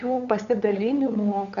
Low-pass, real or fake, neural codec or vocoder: 7.2 kHz; fake; codec, 16 kHz, 8 kbps, FunCodec, trained on LibriTTS, 25 frames a second